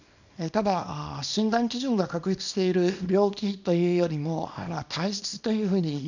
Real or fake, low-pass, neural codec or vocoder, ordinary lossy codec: fake; 7.2 kHz; codec, 24 kHz, 0.9 kbps, WavTokenizer, small release; none